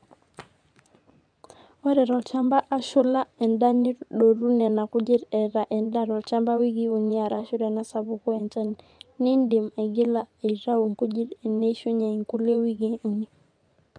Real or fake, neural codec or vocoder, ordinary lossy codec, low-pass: fake; vocoder, 24 kHz, 100 mel bands, Vocos; none; 9.9 kHz